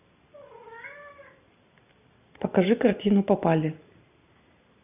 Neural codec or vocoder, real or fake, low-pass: none; real; 3.6 kHz